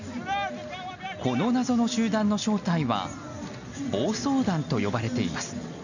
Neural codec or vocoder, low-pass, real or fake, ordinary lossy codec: none; 7.2 kHz; real; none